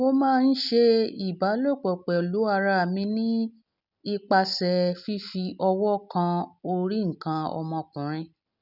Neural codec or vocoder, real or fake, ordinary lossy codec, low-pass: none; real; none; 5.4 kHz